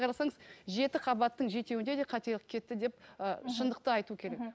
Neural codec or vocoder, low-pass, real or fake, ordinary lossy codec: none; none; real; none